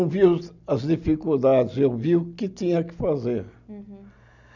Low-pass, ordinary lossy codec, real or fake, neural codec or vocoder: 7.2 kHz; none; real; none